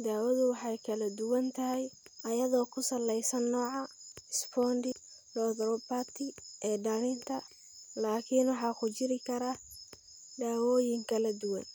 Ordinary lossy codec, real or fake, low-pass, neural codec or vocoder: none; real; none; none